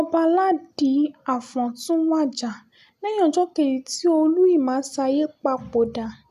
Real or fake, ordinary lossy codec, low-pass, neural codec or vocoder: real; none; 14.4 kHz; none